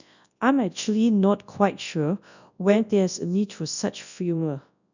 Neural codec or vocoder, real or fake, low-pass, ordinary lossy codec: codec, 24 kHz, 0.9 kbps, WavTokenizer, large speech release; fake; 7.2 kHz; none